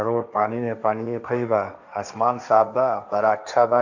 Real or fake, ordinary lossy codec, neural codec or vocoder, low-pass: fake; none; codec, 16 kHz, 1.1 kbps, Voila-Tokenizer; 7.2 kHz